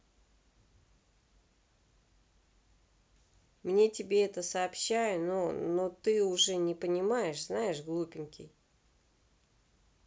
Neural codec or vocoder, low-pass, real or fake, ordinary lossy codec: none; none; real; none